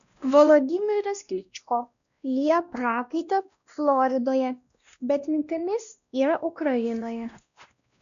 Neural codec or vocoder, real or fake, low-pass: codec, 16 kHz, 1 kbps, X-Codec, WavLM features, trained on Multilingual LibriSpeech; fake; 7.2 kHz